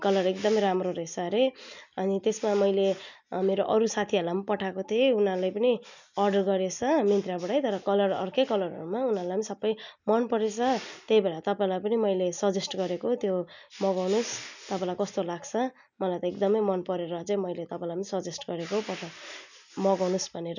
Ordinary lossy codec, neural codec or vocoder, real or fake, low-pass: none; none; real; 7.2 kHz